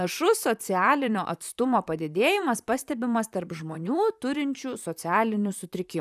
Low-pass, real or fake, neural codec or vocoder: 14.4 kHz; fake; vocoder, 44.1 kHz, 128 mel bands, Pupu-Vocoder